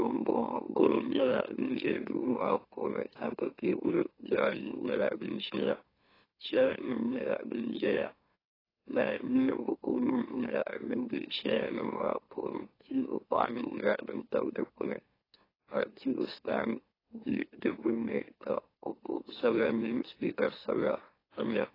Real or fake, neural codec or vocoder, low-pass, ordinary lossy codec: fake; autoencoder, 44.1 kHz, a latent of 192 numbers a frame, MeloTTS; 5.4 kHz; AAC, 24 kbps